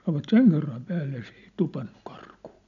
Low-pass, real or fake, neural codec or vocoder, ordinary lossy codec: 7.2 kHz; real; none; none